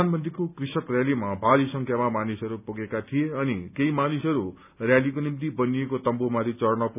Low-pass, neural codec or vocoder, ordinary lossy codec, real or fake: 3.6 kHz; none; none; real